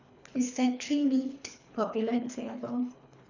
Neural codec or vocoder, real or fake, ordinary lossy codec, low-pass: codec, 24 kHz, 3 kbps, HILCodec; fake; none; 7.2 kHz